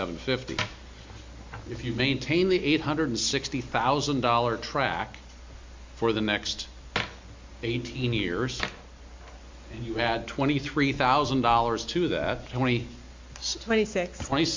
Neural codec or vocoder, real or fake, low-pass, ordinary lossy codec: none; real; 7.2 kHz; MP3, 64 kbps